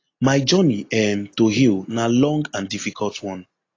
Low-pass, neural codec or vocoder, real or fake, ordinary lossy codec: 7.2 kHz; none; real; AAC, 32 kbps